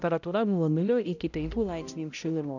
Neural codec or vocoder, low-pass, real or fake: codec, 16 kHz, 0.5 kbps, X-Codec, HuBERT features, trained on balanced general audio; 7.2 kHz; fake